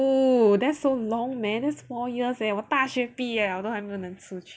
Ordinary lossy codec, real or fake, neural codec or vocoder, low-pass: none; real; none; none